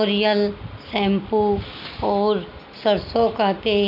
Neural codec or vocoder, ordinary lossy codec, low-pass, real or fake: none; none; 5.4 kHz; real